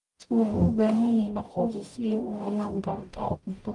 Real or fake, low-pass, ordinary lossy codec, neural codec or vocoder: fake; 10.8 kHz; Opus, 32 kbps; codec, 44.1 kHz, 0.9 kbps, DAC